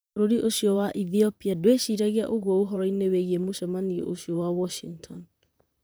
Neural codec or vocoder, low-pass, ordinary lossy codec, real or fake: vocoder, 44.1 kHz, 128 mel bands, Pupu-Vocoder; none; none; fake